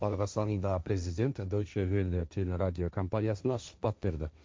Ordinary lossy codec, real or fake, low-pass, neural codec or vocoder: none; fake; none; codec, 16 kHz, 1.1 kbps, Voila-Tokenizer